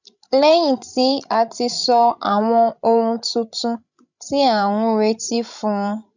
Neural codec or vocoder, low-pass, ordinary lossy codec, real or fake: codec, 16 kHz, 16 kbps, FreqCodec, larger model; 7.2 kHz; none; fake